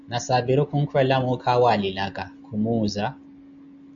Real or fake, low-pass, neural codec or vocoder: real; 7.2 kHz; none